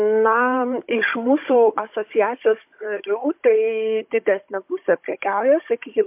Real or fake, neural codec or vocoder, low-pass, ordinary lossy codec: fake; codec, 16 kHz, 16 kbps, FunCodec, trained on Chinese and English, 50 frames a second; 3.6 kHz; MP3, 32 kbps